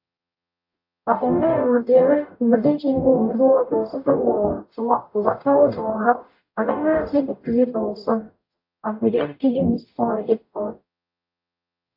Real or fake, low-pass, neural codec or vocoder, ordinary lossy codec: fake; 5.4 kHz; codec, 44.1 kHz, 0.9 kbps, DAC; AAC, 48 kbps